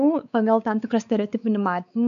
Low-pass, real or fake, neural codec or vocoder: 7.2 kHz; fake; codec, 16 kHz, 4 kbps, X-Codec, WavLM features, trained on Multilingual LibriSpeech